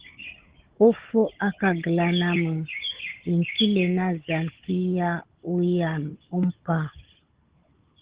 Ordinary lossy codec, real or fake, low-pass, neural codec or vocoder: Opus, 16 kbps; fake; 3.6 kHz; codec, 16 kHz, 16 kbps, FunCodec, trained on Chinese and English, 50 frames a second